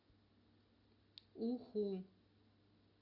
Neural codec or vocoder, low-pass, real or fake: none; 5.4 kHz; real